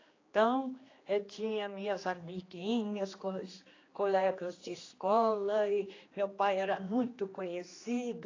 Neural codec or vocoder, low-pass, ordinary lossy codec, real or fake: codec, 16 kHz, 2 kbps, X-Codec, HuBERT features, trained on general audio; 7.2 kHz; AAC, 32 kbps; fake